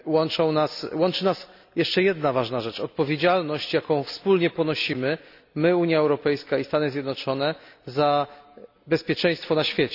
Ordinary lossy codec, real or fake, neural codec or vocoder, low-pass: none; real; none; 5.4 kHz